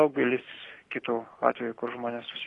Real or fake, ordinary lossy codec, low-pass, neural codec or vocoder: real; AAC, 32 kbps; 10.8 kHz; none